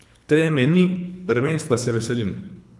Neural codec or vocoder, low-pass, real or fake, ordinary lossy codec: codec, 24 kHz, 3 kbps, HILCodec; none; fake; none